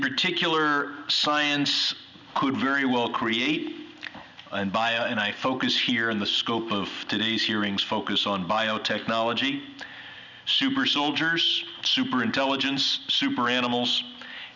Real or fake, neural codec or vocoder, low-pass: real; none; 7.2 kHz